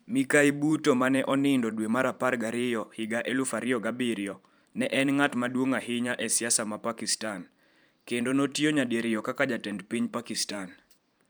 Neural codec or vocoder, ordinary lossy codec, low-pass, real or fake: vocoder, 44.1 kHz, 128 mel bands every 512 samples, BigVGAN v2; none; none; fake